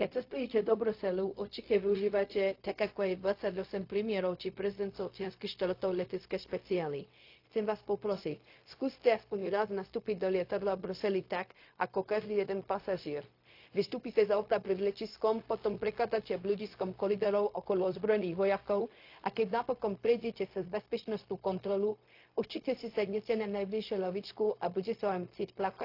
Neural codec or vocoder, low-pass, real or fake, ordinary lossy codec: codec, 16 kHz, 0.4 kbps, LongCat-Audio-Codec; 5.4 kHz; fake; AAC, 32 kbps